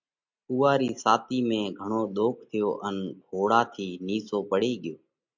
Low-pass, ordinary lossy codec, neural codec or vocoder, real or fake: 7.2 kHz; MP3, 64 kbps; none; real